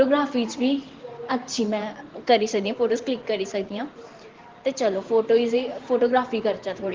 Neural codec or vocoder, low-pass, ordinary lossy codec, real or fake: vocoder, 44.1 kHz, 128 mel bands every 512 samples, BigVGAN v2; 7.2 kHz; Opus, 16 kbps; fake